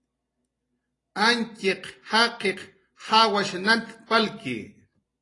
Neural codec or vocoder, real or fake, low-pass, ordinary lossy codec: none; real; 10.8 kHz; AAC, 32 kbps